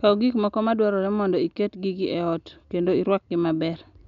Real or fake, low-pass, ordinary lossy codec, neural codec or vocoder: real; 7.2 kHz; none; none